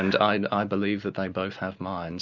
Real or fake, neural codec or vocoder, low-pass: real; none; 7.2 kHz